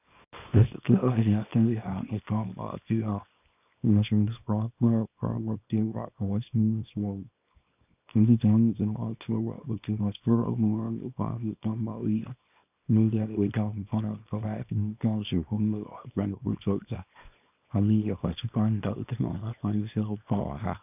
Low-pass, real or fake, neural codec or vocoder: 3.6 kHz; fake; codec, 24 kHz, 0.9 kbps, WavTokenizer, small release